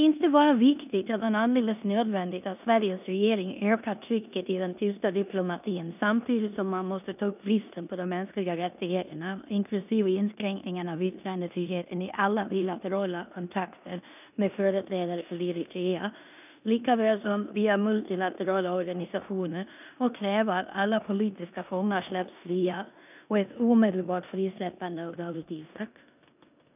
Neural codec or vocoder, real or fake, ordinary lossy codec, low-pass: codec, 16 kHz in and 24 kHz out, 0.9 kbps, LongCat-Audio-Codec, four codebook decoder; fake; none; 3.6 kHz